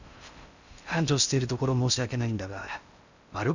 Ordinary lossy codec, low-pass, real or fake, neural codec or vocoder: none; 7.2 kHz; fake; codec, 16 kHz in and 24 kHz out, 0.8 kbps, FocalCodec, streaming, 65536 codes